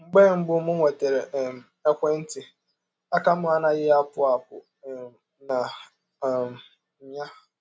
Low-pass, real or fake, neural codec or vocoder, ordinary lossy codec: none; real; none; none